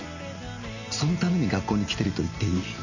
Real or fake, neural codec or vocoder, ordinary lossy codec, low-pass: real; none; none; 7.2 kHz